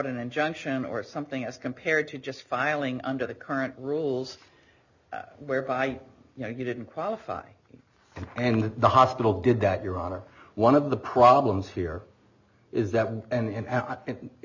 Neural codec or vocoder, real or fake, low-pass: none; real; 7.2 kHz